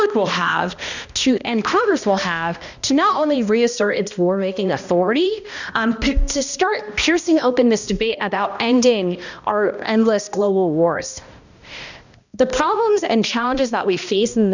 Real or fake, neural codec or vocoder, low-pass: fake; codec, 16 kHz, 1 kbps, X-Codec, HuBERT features, trained on balanced general audio; 7.2 kHz